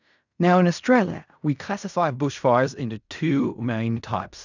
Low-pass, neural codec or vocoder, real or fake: 7.2 kHz; codec, 16 kHz in and 24 kHz out, 0.4 kbps, LongCat-Audio-Codec, fine tuned four codebook decoder; fake